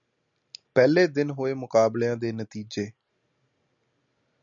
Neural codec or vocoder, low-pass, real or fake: none; 7.2 kHz; real